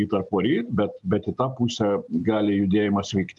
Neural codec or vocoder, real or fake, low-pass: none; real; 10.8 kHz